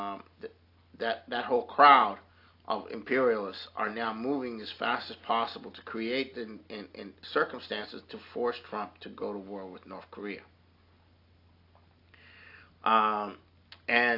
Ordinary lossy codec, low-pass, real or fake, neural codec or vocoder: AAC, 32 kbps; 5.4 kHz; real; none